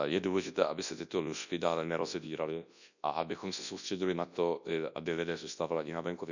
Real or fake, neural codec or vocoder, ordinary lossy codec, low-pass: fake; codec, 24 kHz, 0.9 kbps, WavTokenizer, large speech release; none; 7.2 kHz